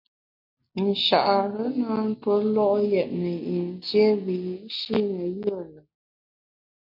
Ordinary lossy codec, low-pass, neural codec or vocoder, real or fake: MP3, 48 kbps; 5.4 kHz; none; real